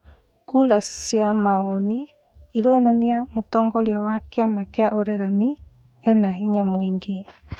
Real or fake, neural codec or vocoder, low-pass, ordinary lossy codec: fake; codec, 44.1 kHz, 2.6 kbps, DAC; 19.8 kHz; none